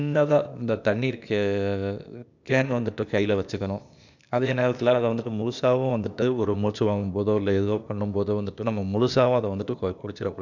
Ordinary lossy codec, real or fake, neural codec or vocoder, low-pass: none; fake; codec, 16 kHz, 0.8 kbps, ZipCodec; 7.2 kHz